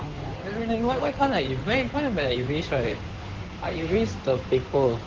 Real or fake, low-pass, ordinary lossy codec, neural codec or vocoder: fake; 7.2 kHz; Opus, 16 kbps; codec, 16 kHz in and 24 kHz out, 2.2 kbps, FireRedTTS-2 codec